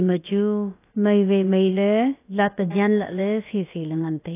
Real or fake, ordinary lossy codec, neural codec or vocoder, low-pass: fake; AAC, 24 kbps; codec, 16 kHz, about 1 kbps, DyCAST, with the encoder's durations; 3.6 kHz